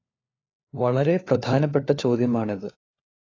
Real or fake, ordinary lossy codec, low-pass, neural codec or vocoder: fake; AAC, 32 kbps; 7.2 kHz; codec, 16 kHz, 4 kbps, FunCodec, trained on LibriTTS, 50 frames a second